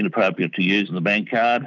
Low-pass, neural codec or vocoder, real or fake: 7.2 kHz; none; real